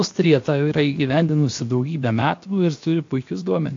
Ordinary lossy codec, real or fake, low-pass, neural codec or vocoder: AAC, 48 kbps; fake; 7.2 kHz; codec, 16 kHz, 0.7 kbps, FocalCodec